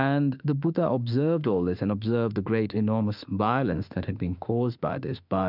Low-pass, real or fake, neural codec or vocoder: 5.4 kHz; fake; autoencoder, 48 kHz, 32 numbers a frame, DAC-VAE, trained on Japanese speech